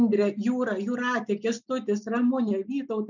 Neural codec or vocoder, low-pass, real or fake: none; 7.2 kHz; real